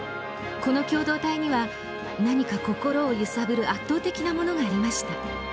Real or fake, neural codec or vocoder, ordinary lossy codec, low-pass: real; none; none; none